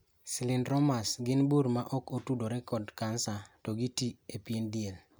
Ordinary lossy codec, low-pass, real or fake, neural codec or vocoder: none; none; real; none